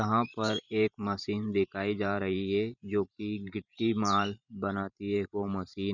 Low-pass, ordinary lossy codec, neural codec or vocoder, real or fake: 7.2 kHz; none; vocoder, 44.1 kHz, 128 mel bands every 512 samples, BigVGAN v2; fake